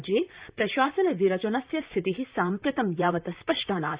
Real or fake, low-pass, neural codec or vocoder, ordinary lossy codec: fake; 3.6 kHz; vocoder, 44.1 kHz, 128 mel bands, Pupu-Vocoder; Opus, 64 kbps